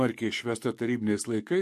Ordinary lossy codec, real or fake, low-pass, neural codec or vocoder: MP3, 64 kbps; fake; 14.4 kHz; codec, 44.1 kHz, 7.8 kbps, DAC